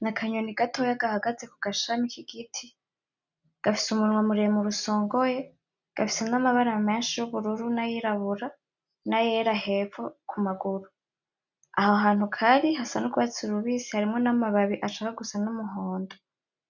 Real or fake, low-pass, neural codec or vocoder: real; 7.2 kHz; none